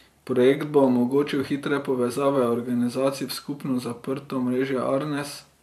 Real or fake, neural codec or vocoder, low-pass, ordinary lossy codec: fake; vocoder, 44.1 kHz, 128 mel bands every 512 samples, BigVGAN v2; 14.4 kHz; none